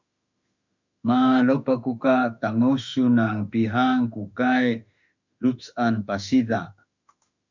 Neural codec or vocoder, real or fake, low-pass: autoencoder, 48 kHz, 32 numbers a frame, DAC-VAE, trained on Japanese speech; fake; 7.2 kHz